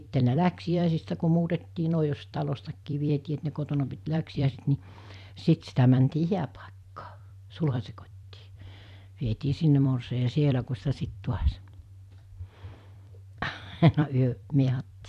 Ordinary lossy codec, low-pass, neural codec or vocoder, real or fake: none; 14.4 kHz; vocoder, 44.1 kHz, 128 mel bands every 256 samples, BigVGAN v2; fake